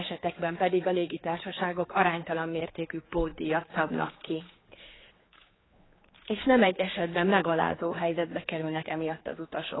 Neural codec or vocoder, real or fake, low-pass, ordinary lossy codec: codec, 16 kHz, 4 kbps, X-Codec, HuBERT features, trained on general audio; fake; 7.2 kHz; AAC, 16 kbps